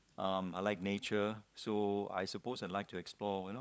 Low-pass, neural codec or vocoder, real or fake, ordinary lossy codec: none; codec, 16 kHz, 16 kbps, FunCodec, trained on LibriTTS, 50 frames a second; fake; none